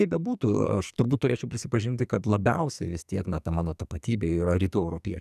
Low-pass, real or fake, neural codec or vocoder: 14.4 kHz; fake; codec, 44.1 kHz, 2.6 kbps, SNAC